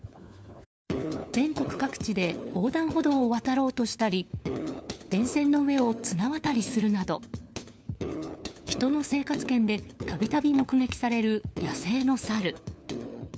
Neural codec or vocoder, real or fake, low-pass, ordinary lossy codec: codec, 16 kHz, 4 kbps, FunCodec, trained on LibriTTS, 50 frames a second; fake; none; none